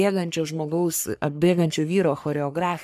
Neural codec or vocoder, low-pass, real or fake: codec, 44.1 kHz, 3.4 kbps, Pupu-Codec; 14.4 kHz; fake